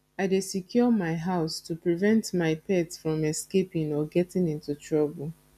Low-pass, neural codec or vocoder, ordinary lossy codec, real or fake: 14.4 kHz; none; none; real